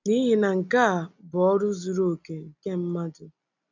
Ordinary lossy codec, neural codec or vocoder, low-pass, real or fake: none; none; 7.2 kHz; real